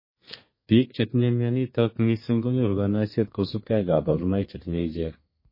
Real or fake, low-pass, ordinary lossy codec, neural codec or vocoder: fake; 5.4 kHz; MP3, 24 kbps; codec, 32 kHz, 1.9 kbps, SNAC